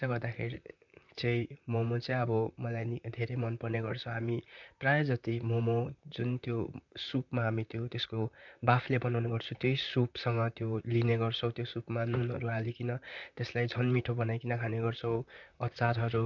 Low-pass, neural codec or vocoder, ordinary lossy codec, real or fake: 7.2 kHz; vocoder, 44.1 kHz, 128 mel bands, Pupu-Vocoder; none; fake